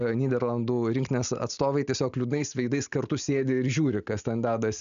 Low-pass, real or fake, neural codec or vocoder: 7.2 kHz; real; none